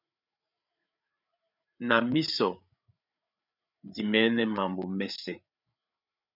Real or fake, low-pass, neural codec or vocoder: fake; 5.4 kHz; codec, 16 kHz, 8 kbps, FreqCodec, larger model